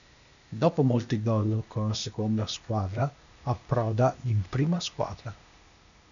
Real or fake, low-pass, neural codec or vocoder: fake; 7.2 kHz; codec, 16 kHz, 0.8 kbps, ZipCodec